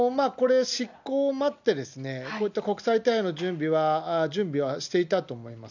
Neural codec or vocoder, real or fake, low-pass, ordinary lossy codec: none; real; 7.2 kHz; none